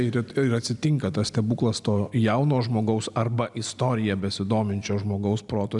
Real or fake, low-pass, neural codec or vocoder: real; 10.8 kHz; none